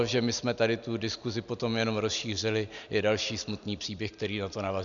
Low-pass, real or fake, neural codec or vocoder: 7.2 kHz; real; none